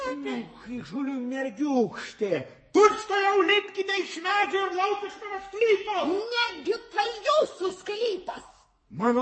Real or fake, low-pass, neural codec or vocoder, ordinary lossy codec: fake; 9.9 kHz; codec, 44.1 kHz, 2.6 kbps, SNAC; MP3, 32 kbps